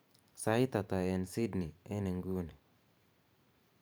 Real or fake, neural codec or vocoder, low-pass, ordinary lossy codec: fake; vocoder, 44.1 kHz, 128 mel bands every 512 samples, BigVGAN v2; none; none